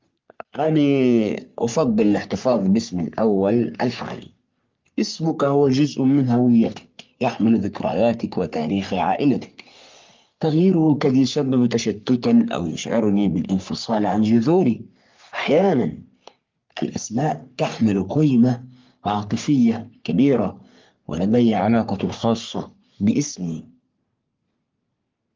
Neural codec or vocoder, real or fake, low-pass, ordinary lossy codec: codec, 44.1 kHz, 3.4 kbps, Pupu-Codec; fake; 7.2 kHz; Opus, 32 kbps